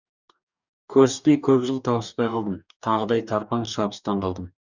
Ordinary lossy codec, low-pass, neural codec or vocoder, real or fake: none; 7.2 kHz; codec, 44.1 kHz, 2.6 kbps, DAC; fake